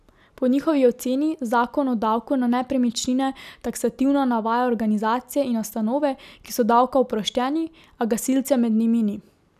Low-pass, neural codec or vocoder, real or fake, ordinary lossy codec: 14.4 kHz; none; real; none